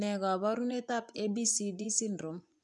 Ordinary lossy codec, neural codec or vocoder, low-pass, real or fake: none; vocoder, 24 kHz, 100 mel bands, Vocos; 10.8 kHz; fake